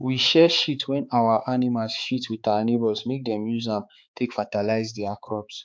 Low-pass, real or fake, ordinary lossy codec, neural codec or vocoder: none; fake; none; codec, 16 kHz, 4 kbps, X-Codec, HuBERT features, trained on balanced general audio